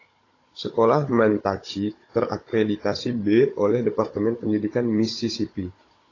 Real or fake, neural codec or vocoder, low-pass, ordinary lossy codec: fake; codec, 16 kHz, 16 kbps, FunCodec, trained on Chinese and English, 50 frames a second; 7.2 kHz; AAC, 32 kbps